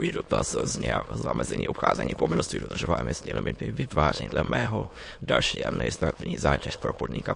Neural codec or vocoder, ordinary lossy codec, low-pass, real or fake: autoencoder, 22.05 kHz, a latent of 192 numbers a frame, VITS, trained on many speakers; MP3, 48 kbps; 9.9 kHz; fake